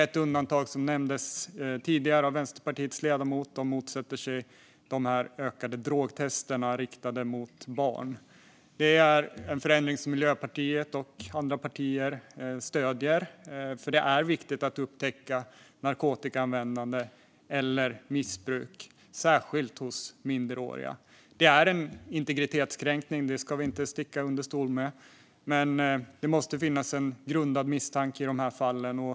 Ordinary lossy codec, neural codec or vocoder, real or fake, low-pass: none; none; real; none